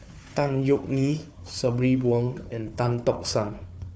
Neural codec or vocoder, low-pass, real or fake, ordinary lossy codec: codec, 16 kHz, 4 kbps, FunCodec, trained on Chinese and English, 50 frames a second; none; fake; none